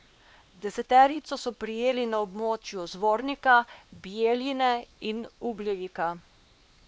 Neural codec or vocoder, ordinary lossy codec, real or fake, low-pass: codec, 16 kHz, 2 kbps, X-Codec, WavLM features, trained on Multilingual LibriSpeech; none; fake; none